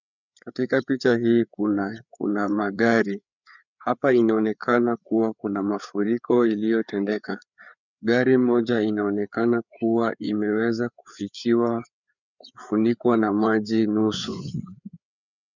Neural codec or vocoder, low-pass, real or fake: codec, 16 kHz, 4 kbps, FreqCodec, larger model; 7.2 kHz; fake